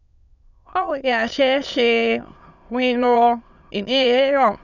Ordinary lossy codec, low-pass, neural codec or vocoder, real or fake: none; 7.2 kHz; autoencoder, 22.05 kHz, a latent of 192 numbers a frame, VITS, trained on many speakers; fake